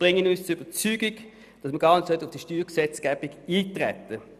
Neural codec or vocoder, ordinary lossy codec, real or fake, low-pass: none; none; real; 14.4 kHz